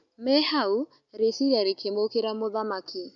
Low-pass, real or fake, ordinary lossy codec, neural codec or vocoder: 7.2 kHz; real; none; none